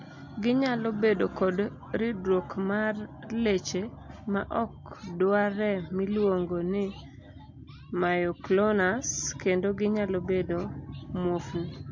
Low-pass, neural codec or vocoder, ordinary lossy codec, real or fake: 7.2 kHz; none; MP3, 48 kbps; real